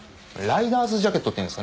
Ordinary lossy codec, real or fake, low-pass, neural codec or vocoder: none; real; none; none